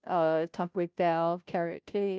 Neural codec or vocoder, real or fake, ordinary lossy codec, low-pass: codec, 16 kHz, 0.5 kbps, FunCodec, trained on Chinese and English, 25 frames a second; fake; none; none